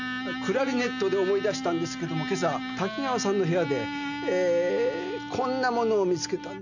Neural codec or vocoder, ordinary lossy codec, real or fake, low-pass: none; none; real; 7.2 kHz